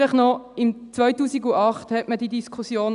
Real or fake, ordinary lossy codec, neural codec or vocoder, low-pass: real; none; none; 10.8 kHz